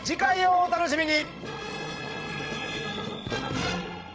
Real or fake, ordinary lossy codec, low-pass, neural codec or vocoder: fake; none; none; codec, 16 kHz, 16 kbps, FreqCodec, larger model